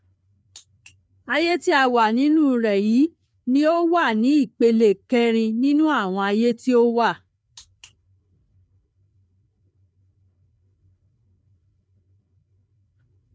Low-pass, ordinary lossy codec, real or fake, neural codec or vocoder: none; none; fake; codec, 16 kHz, 4 kbps, FreqCodec, larger model